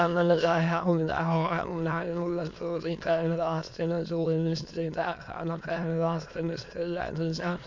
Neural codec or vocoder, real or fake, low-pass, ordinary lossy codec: autoencoder, 22.05 kHz, a latent of 192 numbers a frame, VITS, trained on many speakers; fake; 7.2 kHz; MP3, 48 kbps